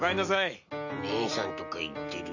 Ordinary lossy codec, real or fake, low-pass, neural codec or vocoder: none; real; 7.2 kHz; none